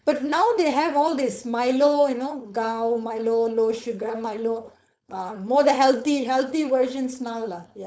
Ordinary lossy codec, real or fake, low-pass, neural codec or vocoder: none; fake; none; codec, 16 kHz, 4.8 kbps, FACodec